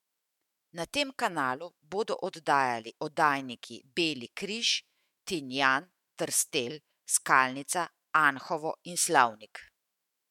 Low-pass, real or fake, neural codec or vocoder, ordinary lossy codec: 19.8 kHz; fake; autoencoder, 48 kHz, 128 numbers a frame, DAC-VAE, trained on Japanese speech; MP3, 96 kbps